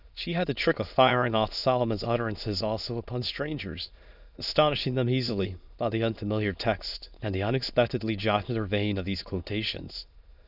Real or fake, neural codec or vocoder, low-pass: fake; autoencoder, 22.05 kHz, a latent of 192 numbers a frame, VITS, trained on many speakers; 5.4 kHz